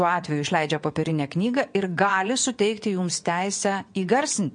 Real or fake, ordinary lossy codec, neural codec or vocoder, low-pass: fake; MP3, 48 kbps; vocoder, 22.05 kHz, 80 mel bands, WaveNeXt; 9.9 kHz